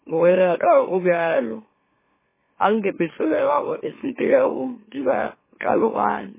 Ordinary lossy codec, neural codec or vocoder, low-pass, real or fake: MP3, 16 kbps; autoencoder, 44.1 kHz, a latent of 192 numbers a frame, MeloTTS; 3.6 kHz; fake